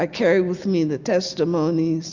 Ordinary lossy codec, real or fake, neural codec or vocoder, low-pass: Opus, 64 kbps; real; none; 7.2 kHz